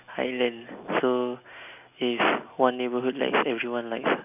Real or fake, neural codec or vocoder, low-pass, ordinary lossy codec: real; none; 3.6 kHz; none